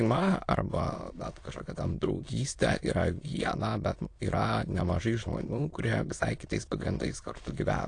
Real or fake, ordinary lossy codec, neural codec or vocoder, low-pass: fake; AAC, 48 kbps; autoencoder, 22.05 kHz, a latent of 192 numbers a frame, VITS, trained on many speakers; 9.9 kHz